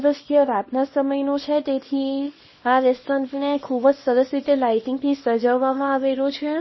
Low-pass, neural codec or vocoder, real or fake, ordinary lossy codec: 7.2 kHz; codec, 24 kHz, 0.9 kbps, WavTokenizer, small release; fake; MP3, 24 kbps